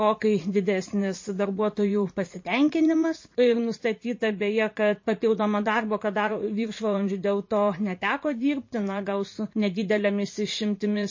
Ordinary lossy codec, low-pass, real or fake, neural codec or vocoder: MP3, 32 kbps; 7.2 kHz; fake; vocoder, 44.1 kHz, 80 mel bands, Vocos